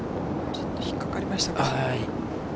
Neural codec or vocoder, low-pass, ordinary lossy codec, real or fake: none; none; none; real